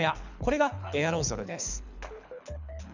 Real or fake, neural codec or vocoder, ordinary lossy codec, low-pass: fake; codec, 24 kHz, 6 kbps, HILCodec; none; 7.2 kHz